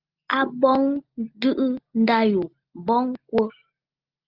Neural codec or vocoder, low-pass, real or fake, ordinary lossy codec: none; 5.4 kHz; real; Opus, 24 kbps